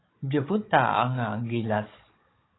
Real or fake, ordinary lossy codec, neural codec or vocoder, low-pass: fake; AAC, 16 kbps; codec, 16 kHz, 16 kbps, FunCodec, trained on Chinese and English, 50 frames a second; 7.2 kHz